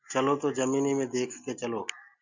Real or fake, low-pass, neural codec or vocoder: real; 7.2 kHz; none